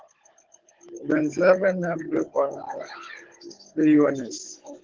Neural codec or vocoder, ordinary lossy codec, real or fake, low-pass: codec, 16 kHz, 16 kbps, FunCodec, trained on Chinese and English, 50 frames a second; Opus, 16 kbps; fake; 7.2 kHz